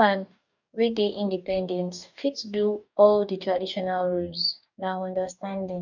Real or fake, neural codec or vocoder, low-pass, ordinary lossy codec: fake; codec, 44.1 kHz, 2.6 kbps, DAC; 7.2 kHz; none